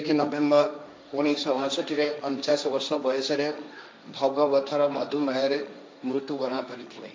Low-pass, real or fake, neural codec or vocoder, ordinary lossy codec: none; fake; codec, 16 kHz, 1.1 kbps, Voila-Tokenizer; none